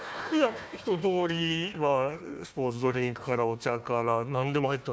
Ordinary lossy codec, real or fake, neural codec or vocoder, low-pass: none; fake; codec, 16 kHz, 1 kbps, FunCodec, trained on Chinese and English, 50 frames a second; none